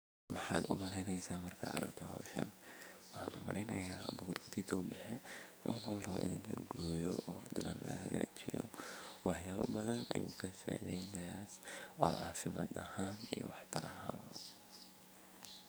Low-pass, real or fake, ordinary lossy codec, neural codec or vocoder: none; fake; none; codec, 44.1 kHz, 2.6 kbps, SNAC